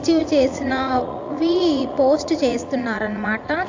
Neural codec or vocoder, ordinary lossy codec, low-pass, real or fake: vocoder, 44.1 kHz, 80 mel bands, Vocos; AAC, 48 kbps; 7.2 kHz; fake